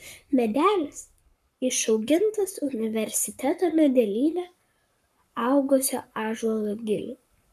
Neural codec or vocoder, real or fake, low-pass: codec, 44.1 kHz, 7.8 kbps, Pupu-Codec; fake; 14.4 kHz